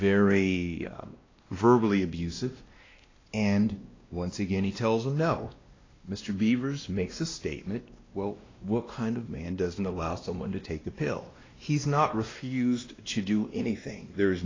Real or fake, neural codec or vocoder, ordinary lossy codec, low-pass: fake; codec, 16 kHz, 1 kbps, X-Codec, WavLM features, trained on Multilingual LibriSpeech; AAC, 32 kbps; 7.2 kHz